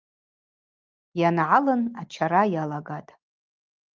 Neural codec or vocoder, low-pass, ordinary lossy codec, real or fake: none; 7.2 kHz; Opus, 32 kbps; real